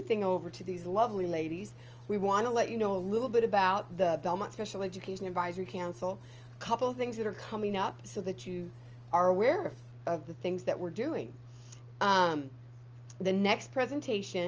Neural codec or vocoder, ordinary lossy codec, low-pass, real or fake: none; Opus, 24 kbps; 7.2 kHz; real